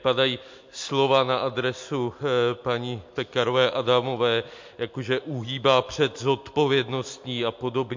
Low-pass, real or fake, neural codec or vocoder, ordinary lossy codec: 7.2 kHz; real; none; MP3, 48 kbps